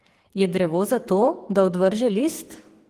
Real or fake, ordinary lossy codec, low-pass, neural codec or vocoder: fake; Opus, 24 kbps; 14.4 kHz; codec, 44.1 kHz, 2.6 kbps, SNAC